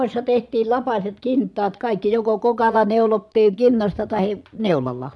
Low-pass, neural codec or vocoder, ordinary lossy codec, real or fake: none; vocoder, 22.05 kHz, 80 mel bands, Vocos; none; fake